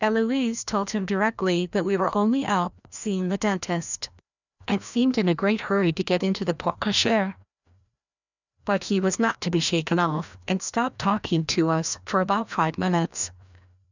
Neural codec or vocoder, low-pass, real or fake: codec, 16 kHz, 1 kbps, FreqCodec, larger model; 7.2 kHz; fake